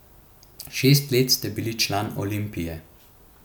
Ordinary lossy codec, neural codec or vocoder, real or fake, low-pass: none; none; real; none